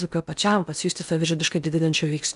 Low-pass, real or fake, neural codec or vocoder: 10.8 kHz; fake; codec, 16 kHz in and 24 kHz out, 0.8 kbps, FocalCodec, streaming, 65536 codes